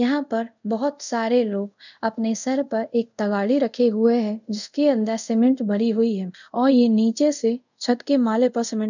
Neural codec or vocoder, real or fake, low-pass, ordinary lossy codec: codec, 24 kHz, 0.5 kbps, DualCodec; fake; 7.2 kHz; none